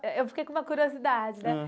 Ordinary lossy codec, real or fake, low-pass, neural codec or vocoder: none; real; none; none